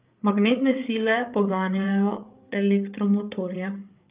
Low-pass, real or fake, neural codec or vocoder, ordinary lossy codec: 3.6 kHz; fake; codec, 16 kHz, 8 kbps, FreqCodec, larger model; Opus, 32 kbps